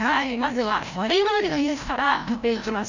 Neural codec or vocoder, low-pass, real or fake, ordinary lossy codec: codec, 16 kHz, 0.5 kbps, FreqCodec, larger model; 7.2 kHz; fake; none